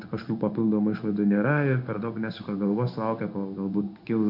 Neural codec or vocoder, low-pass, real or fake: codec, 16 kHz in and 24 kHz out, 1 kbps, XY-Tokenizer; 5.4 kHz; fake